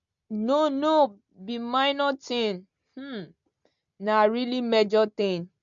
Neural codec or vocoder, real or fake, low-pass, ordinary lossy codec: none; real; 7.2 kHz; MP3, 64 kbps